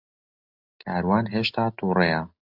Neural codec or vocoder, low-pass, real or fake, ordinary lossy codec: none; 5.4 kHz; real; AAC, 48 kbps